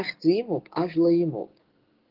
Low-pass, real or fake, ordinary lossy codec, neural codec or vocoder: 5.4 kHz; fake; Opus, 16 kbps; codec, 16 kHz, 6 kbps, DAC